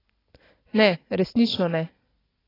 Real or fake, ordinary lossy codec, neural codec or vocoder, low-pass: real; AAC, 24 kbps; none; 5.4 kHz